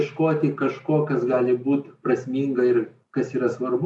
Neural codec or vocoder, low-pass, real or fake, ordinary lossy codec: none; 10.8 kHz; real; AAC, 64 kbps